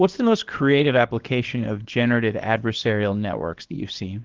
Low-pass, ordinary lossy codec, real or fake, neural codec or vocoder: 7.2 kHz; Opus, 16 kbps; fake; codec, 24 kHz, 0.9 kbps, WavTokenizer, small release